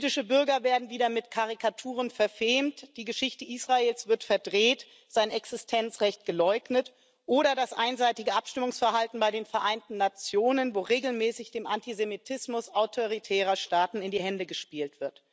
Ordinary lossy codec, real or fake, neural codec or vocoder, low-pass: none; real; none; none